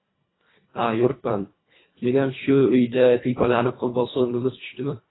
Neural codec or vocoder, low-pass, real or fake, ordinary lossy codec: codec, 24 kHz, 1.5 kbps, HILCodec; 7.2 kHz; fake; AAC, 16 kbps